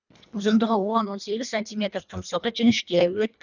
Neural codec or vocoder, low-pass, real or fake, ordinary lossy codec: codec, 24 kHz, 1.5 kbps, HILCodec; 7.2 kHz; fake; none